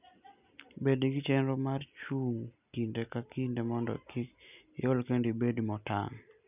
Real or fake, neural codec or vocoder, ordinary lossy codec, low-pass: real; none; none; 3.6 kHz